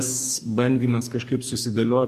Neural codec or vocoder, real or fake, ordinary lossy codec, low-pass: codec, 44.1 kHz, 2.6 kbps, DAC; fake; MP3, 64 kbps; 14.4 kHz